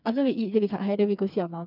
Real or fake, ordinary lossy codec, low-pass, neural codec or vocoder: fake; none; 5.4 kHz; codec, 16 kHz, 4 kbps, FreqCodec, smaller model